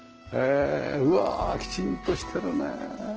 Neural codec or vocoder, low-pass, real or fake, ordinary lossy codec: none; 7.2 kHz; real; Opus, 16 kbps